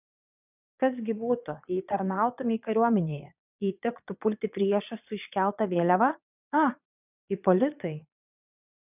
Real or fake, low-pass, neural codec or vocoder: fake; 3.6 kHz; vocoder, 22.05 kHz, 80 mel bands, Vocos